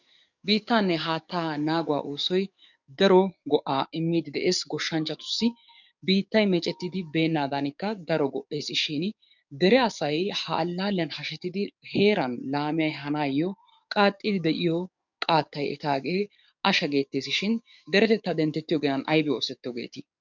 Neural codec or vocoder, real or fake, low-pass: codec, 44.1 kHz, 7.8 kbps, DAC; fake; 7.2 kHz